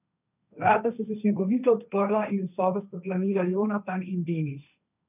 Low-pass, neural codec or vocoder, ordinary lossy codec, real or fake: 3.6 kHz; codec, 16 kHz, 1.1 kbps, Voila-Tokenizer; none; fake